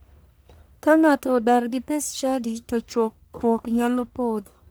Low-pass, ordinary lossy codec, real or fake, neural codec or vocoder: none; none; fake; codec, 44.1 kHz, 1.7 kbps, Pupu-Codec